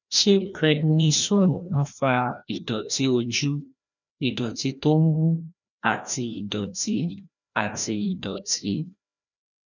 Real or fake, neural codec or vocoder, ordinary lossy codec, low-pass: fake; codec, 16 kHz, 1 kbps, FreqCodec, larger model; none; 7.2 kHz